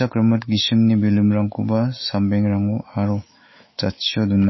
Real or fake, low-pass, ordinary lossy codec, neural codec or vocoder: fake; 7.2 kHz; MP3, 24 kbps; autoencoder, 48 kHz, 128 numbers a frame, DAC-VAE, trained on Japanese speech